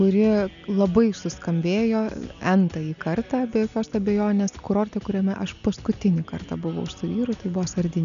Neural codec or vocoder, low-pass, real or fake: none; 7.2 kHz; real